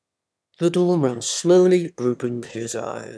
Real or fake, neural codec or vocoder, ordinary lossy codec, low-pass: fake; autoencoder, 22.05 kHz, a latent of 192 numbers a frame, VITS, trained on one speaker; none; none